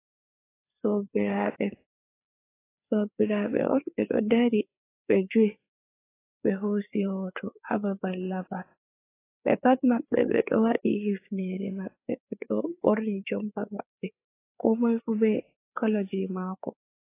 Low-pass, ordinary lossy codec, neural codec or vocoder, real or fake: 3.6 kHz; AAC, 24 kbps; codec, 16 kHz, 4 kbps, FreqCodec, larger model; fake